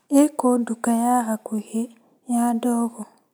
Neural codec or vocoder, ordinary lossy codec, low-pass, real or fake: none; none; none; real